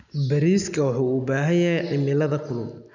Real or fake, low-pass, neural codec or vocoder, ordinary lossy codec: real; 7.2 kHz; none; none